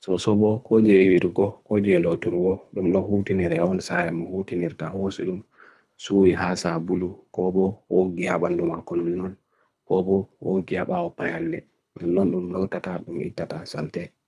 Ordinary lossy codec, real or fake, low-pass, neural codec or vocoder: none; fake; none; codec, 24 kHz, 3 kbps, HILCodec